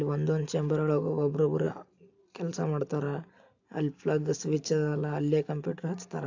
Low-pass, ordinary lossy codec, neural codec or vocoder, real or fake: 7.2 kHz; AAC, 48 kbps; none; real